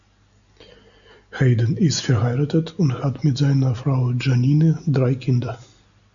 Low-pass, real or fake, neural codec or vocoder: 7.2 kHz; real; none